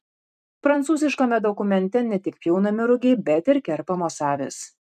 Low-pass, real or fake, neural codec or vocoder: 14.4 kHz; fake; vocoder, 44.1 kHz, 128 mel bands every 256 samples, BigVGAN v2